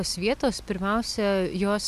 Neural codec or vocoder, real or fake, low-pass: none; real; 14.4 kHz